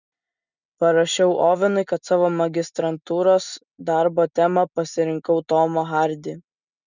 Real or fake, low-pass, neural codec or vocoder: real; 7.2 kHz; none